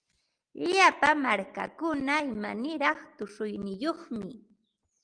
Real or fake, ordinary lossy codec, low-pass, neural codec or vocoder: real; Opus, 24 kbps; 9.9 kHz; none